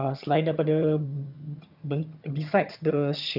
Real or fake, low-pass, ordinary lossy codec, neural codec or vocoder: fake; 5.4 kHz; none; vocoder, 22.05 kHz, 80 mel bands, HiFi-GAN